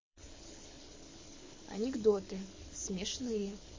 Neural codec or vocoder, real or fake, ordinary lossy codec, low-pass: codec, 24 kHz, 6 kbps, HILCodec; fake; MP3, 32 kbps; 7.2 kHz